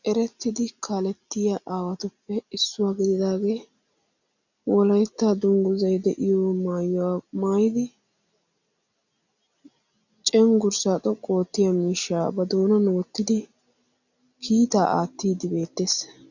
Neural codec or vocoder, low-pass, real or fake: none; 7.2 kHz; real